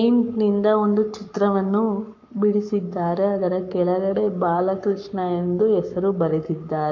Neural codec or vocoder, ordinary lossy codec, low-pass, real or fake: codec, 44.1 kHz, 7.8 kbps, Pupu-Codec; MP3, 48 kbps; 7.2 kHz; fake